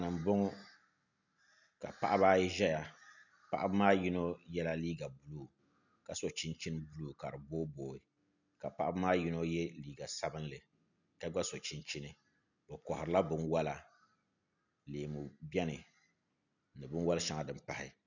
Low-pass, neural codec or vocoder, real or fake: 7.2 kHz; none; real